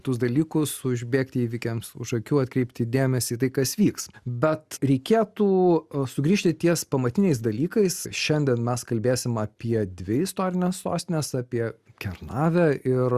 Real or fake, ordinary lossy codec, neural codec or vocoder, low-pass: real; Opus, 64 kbps; none; 14.4 kHz